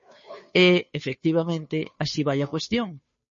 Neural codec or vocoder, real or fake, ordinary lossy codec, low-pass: codec, 16 kHz, 2 kbps, FunCodec, trained on Chinese and English, 25 frames a second; fake; MP3, 32 kbps; 7.2 kHz